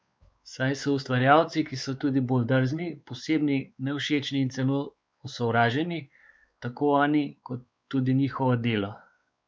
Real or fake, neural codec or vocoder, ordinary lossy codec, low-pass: fake; codec, 16 kHz, 4 kbps, X-Codec, WavLM features, trained on Multilingual LibriSpeech; none; none